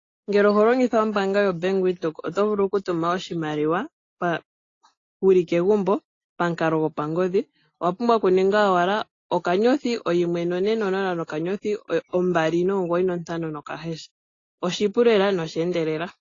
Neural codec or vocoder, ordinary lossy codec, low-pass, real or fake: none; AAC, 32 kbps; 7.2 kHz; real